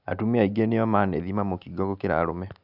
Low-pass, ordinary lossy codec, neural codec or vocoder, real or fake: 5.4 kHz; none; none; real